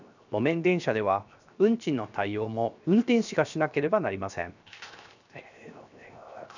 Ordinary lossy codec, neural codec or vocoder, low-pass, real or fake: none; codec, 16 kHz, 0.7 kbps, FocalCodec; 7.2 kHz; fake